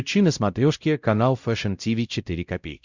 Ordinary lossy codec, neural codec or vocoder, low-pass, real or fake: Opus, 64 kbps; codec, 16 kHz, 0.5 kbps, X-Codec, WavLM features, trained on Multilingual LibriSpeech; 7.2 kHz; fake